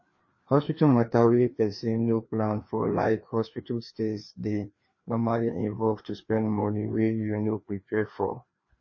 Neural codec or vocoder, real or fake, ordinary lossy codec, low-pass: codec, 16 kHz, 2 kbps, FreqCodec, larger model; fake; MP3, 32 kbps; 7.2 kHz